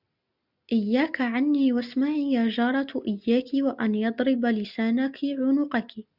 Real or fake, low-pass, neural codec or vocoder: real; 5.4 kHz; none